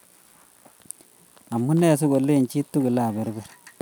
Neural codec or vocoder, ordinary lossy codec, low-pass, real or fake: none; none; none; real